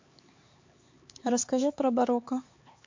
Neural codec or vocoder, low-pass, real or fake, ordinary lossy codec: codec, 16 kHz, 4 kbps, X-Codec, HuBERT features, trained on LibriSpeech; 7.2 kHz; fake; MP3, 48 kbps